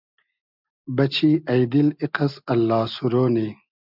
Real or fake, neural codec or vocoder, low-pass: real; none; 5.4 kHz